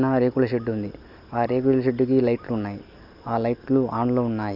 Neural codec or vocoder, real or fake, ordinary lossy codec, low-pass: none; real; none; 5.4 kHz